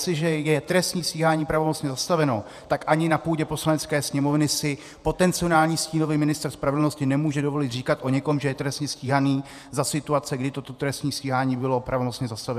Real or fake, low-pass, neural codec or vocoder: fake; 14.4 kHz; vocoder, 48 kHz, 128 mel bands, Vocos